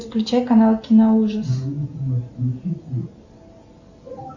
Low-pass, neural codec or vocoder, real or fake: 7.2 kHz; none; real